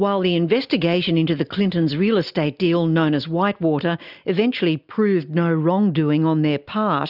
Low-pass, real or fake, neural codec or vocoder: 5.4 kHz; real; none